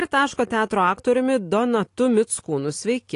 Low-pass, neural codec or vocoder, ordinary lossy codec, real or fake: 10.8 kHz; none; AAC, 48 kbps; real